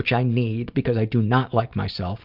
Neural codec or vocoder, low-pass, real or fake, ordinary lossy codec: none; 5.4 kHz; real; Opus, 64 kbps